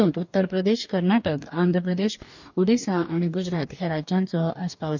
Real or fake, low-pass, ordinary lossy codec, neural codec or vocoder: fake; 7.2 kHz; none; codec, 44.1 kHz, 2.6 kbps, DAC